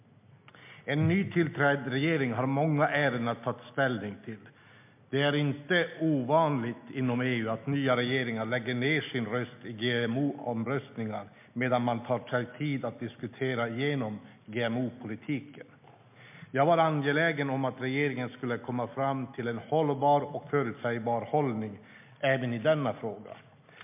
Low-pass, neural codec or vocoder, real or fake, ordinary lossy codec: 3.6 kHz; none; real; MP3, 32 kbps